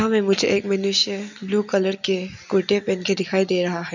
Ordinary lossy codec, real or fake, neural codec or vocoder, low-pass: none; real; none; 7.2 kHz